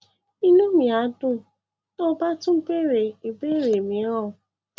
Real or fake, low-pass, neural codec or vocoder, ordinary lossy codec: real; none; none; none